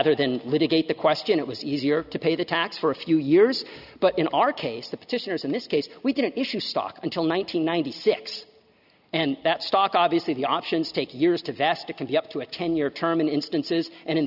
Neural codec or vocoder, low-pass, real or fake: none; 5.4 kHz; real